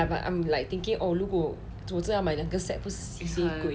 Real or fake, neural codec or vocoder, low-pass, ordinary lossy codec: real; none; none; none